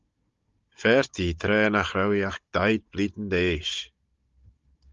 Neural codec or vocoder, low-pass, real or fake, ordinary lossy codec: codec, 16 kHz, 16 kbps, FunCodec, trained on Chinese and English, 50 frames a second; 7.2 kHz; fake; Opus, 32 kbps